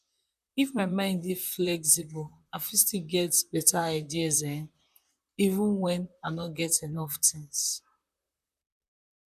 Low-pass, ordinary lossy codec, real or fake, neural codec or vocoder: 14.4 kHz; none; fake; vocoder, 44.1 kHz, 128 mel bands, Pupu-Vocoder